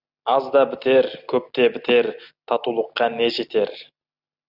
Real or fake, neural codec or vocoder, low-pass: real; none; 5.4 kHz